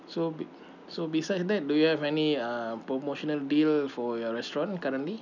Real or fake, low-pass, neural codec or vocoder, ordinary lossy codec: real; 7.2 kHz; none; none